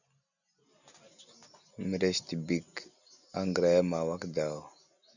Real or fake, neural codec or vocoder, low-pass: real; none; 7.2 kHz